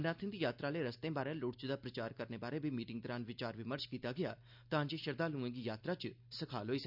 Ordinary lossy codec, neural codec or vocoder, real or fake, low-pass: none; none; real; 5.4 kHz